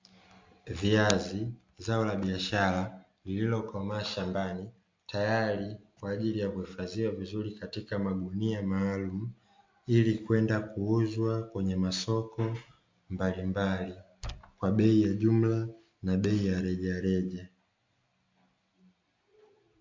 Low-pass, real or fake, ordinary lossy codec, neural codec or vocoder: 7.2 kHz; real; MP3, 48 kbps; none